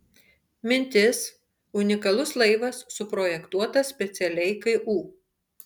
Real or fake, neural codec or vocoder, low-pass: real; none; 19.8 kHz